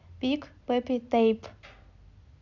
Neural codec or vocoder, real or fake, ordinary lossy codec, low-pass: none; real; AAC, 48 kbps; 7.2 kHz